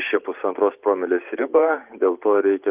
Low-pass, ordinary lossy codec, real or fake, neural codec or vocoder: 3.6 kHz; Opus, 24 kbps; fake; vocoder, 44.1 kHz, 128 mel bands every 512 samples, BigVGAN v2